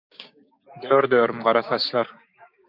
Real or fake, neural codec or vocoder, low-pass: fake; codec, 16 kHz, 6 kbps, DAC; 5.4 kHz